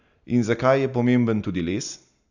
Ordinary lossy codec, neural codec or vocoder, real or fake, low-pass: none; none; real; 7.2 kHz